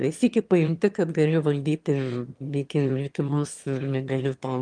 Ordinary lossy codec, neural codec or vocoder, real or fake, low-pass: Opus, 32 kbps; autoencoder, 22.05 kHz, a latent of 192 numbers a frame, VITS, trained on one speaker; fake; 9.9 kHz